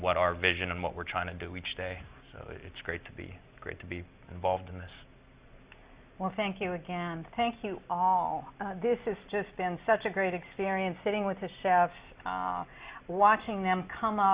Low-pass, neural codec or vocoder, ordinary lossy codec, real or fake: 3.6 kHz; none; Opus, 64 kbps; real